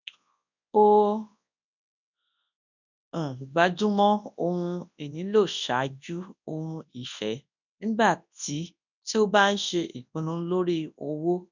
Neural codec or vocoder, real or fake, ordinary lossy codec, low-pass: codec, 24 kHz, 0.9 kbps, WavTokenizer, large speech release; fake; none; 7.2 kHz